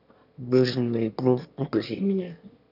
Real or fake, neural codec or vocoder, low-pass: fake; autoencoder, 22.05 kHz, a latent of 192 numbers a frame, VITS, trained on one speaker; 5.4 kHz